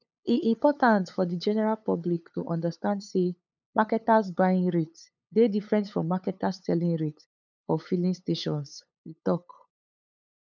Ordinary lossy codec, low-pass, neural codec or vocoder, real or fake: none; 7.2 kHz; codec, 16 kHz, 8 kbps, FunCodec, trained on LibriTTS, 25 frames a second; fake